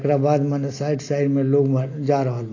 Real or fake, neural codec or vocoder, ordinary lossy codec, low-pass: real; none; AAC, 32 kbps; 7.2 kHz